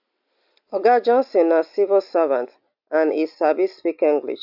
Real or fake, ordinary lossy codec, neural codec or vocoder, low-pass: real; AAC, 48 kbps; none; 5.4 kHz